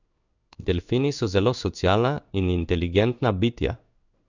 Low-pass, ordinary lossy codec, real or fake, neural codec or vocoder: 7.2 kHz; none; fake; codec, 16 kHz in and 24 kHz out, 1 kbps, XY-Tokenizer